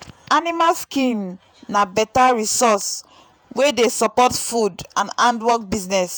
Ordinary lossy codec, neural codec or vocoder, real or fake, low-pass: none; vocoder, 48 kHz, 128 mel bands, Vocos; fake; none